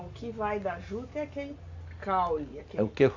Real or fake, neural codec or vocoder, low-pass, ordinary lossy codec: real; none; 7.2 kHz; AAC, 48 kbps